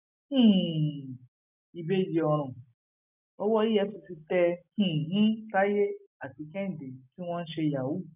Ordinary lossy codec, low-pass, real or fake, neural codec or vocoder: none; 3.6 kHz; real; none